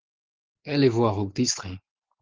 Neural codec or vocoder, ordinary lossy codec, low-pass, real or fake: none; Opus, 16 kbps; 7.2 kHz; real